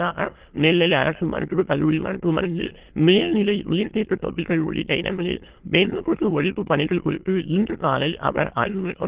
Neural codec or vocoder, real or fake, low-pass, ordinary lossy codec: autoencoder, 22.05 kHz, a latent of 192 numbers a frame, VITS, trained on many speakers; fake; 3.6 kHz; Opus, 16 kbps